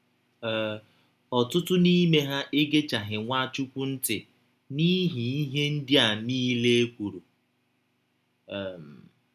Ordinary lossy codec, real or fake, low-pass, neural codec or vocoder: none; real; 14.4 kHz; none